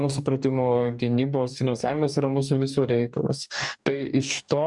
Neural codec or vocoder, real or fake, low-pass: codec, 44.1 kHz, 2.6 kbps, DAC; fake; 10.8 kHz